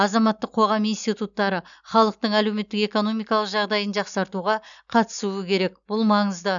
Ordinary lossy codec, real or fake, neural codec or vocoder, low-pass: none; real; none; 7.2 kHz